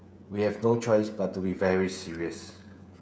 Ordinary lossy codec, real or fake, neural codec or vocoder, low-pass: none; fake; codec, 16 kHz, 16 kbps, FreqCodec, smaller model; none